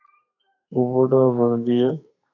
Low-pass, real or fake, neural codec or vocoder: 7.2 kHz; fake; codec, 32 kHz, 1.9 kbps, SNAC